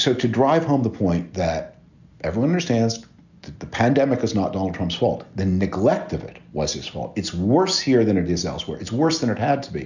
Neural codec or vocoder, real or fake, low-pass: none; real; 7.2 kHz